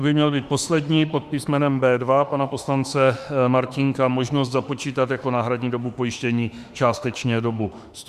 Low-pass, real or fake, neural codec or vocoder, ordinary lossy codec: 14.4 kHz; fake; autoencoder, 48 kHz, 32 numbers a frame, DAC-VAE, trained on Japanese speech; Opus, 64 kbps